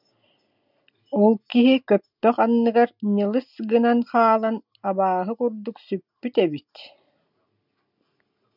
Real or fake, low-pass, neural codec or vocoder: real; 5.4 kHz; none